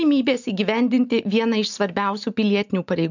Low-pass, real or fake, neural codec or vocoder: 7.2 kHz; real; none